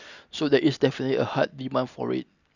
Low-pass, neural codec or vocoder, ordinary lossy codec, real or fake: 7.2 kHz; none; none; real